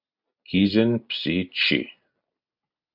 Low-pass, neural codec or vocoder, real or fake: 5.4 kHz; none; real